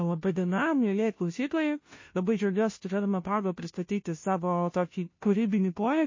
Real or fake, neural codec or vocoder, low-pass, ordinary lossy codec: fake; codec, 16 kHz, 0.5 kbps, FunCodec, trained on Chinese and English, 25 frames a second; 7.2 kHz; MP3, 32 kbps